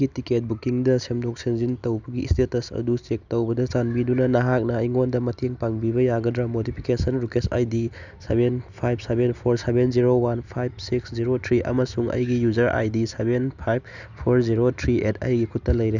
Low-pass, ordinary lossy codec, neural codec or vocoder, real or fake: 7.2 kHz; none; none; real